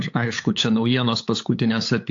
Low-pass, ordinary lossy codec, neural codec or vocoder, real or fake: 7.2 kHz; AAC, 48 kbps; codec, 16 kHz, 8 kbps, FunCodec, trained on LibriTTS, 25 frames a second; fake